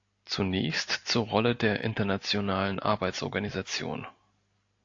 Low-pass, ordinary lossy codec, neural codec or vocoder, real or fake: 7.2 kHz; AAC, 48 kbps; none; real